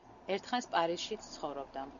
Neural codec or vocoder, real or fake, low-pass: none; real; 7.2 kHz